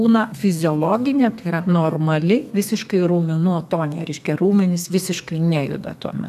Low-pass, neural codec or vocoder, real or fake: 14.4 kHz; codec, 32 kHz, 1.9 kbps, SNAC; fake